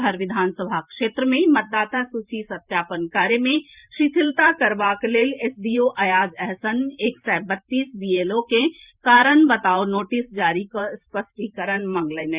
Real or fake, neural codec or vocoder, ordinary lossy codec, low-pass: fake; vocoder, 44.1 kHz, 128 mel bands every 512 samples, BigVGAN v2; Opus, 64 kbps; 3.6 kHz